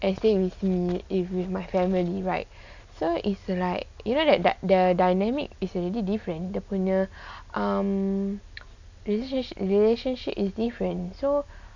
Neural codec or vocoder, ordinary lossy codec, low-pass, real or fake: none; none; 7.2 kHz; real